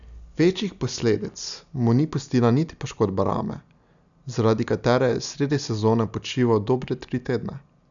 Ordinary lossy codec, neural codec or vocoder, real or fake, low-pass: none; none; real; 7.2 kHz